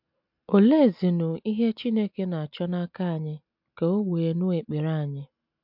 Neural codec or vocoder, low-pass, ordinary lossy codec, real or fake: none; 5.4 kHz; MP3, 48 kbps; real